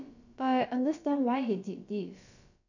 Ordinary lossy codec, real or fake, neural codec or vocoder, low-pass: none; fake; codec, 16 kHz, about 1 kbps, DyCAST, with the encoder's durations; 7.2 kHz